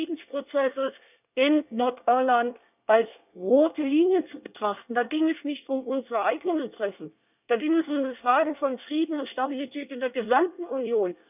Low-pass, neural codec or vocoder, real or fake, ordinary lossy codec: 3.6 kHz; codec, 24 kHz, 1 kbps, SNAC; fake; none